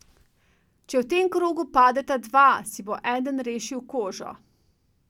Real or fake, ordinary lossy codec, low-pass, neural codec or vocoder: real; none; 19.8 kHz; none